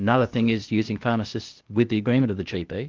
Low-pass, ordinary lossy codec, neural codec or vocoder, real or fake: 7.2 kHz; Opus, 32 kbps; codec, 16 kHz, 0.8 kbps, ZipCodec; fake